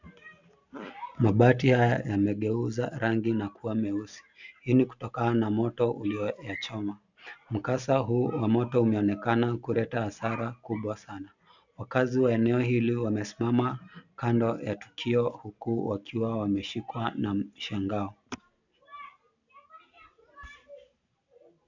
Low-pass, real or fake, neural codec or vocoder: 7.2 kHz; real; none